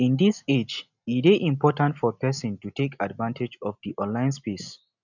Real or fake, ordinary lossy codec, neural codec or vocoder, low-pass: real; none; none; 7.2 kHz